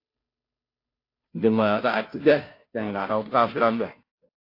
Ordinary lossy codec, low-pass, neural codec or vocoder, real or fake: AAC, 32 kbps; 5.4 kHz; codec, 16 kHz, 0.5 kbps, FunCodec, trained on Chinese and English, 25 frames a second; fake